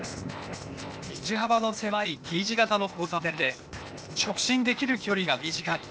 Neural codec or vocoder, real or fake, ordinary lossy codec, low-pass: codec, 16 kHz, 0.8 kbps, ZipCodec; fake; none; none